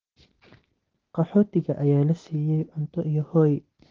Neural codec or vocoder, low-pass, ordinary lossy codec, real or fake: none; 7.2 kHz; Opus, 16 kbps; real